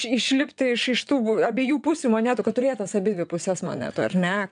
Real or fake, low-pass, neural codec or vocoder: fake; 9.9 kHz; vocoder, 22.05 kHz, 80 mel bands, WaveNeXt